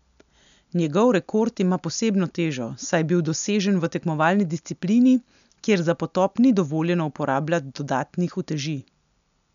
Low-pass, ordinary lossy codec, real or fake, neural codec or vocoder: 7.2 kHz; none; real; none